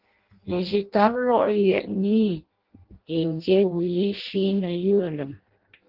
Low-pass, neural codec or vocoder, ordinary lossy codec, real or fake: 5.4 kHz; codec, 16 kHz in and 24 kHz out, 0.6 kbps, FireRedTTS-2 codec; Opus, 16 kbps; fake